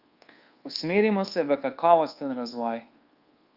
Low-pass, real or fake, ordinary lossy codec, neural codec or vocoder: 5.4 kHz; fake; Opus, 32 kbps; codec, 24 kHz, 1.2 kbps, DualCodec